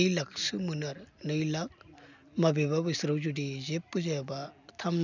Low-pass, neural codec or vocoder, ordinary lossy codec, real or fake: 7.2 kHz; none; none; real